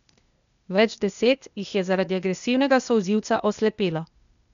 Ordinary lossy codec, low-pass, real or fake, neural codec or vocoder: none; 7.2 kHz; fake; codec, 16 kHz, 0.8 kbps, ZipCodec